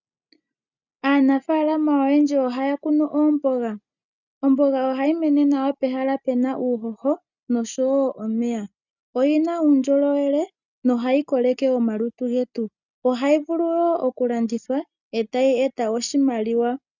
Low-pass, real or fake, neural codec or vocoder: 7.2 kHz; real; none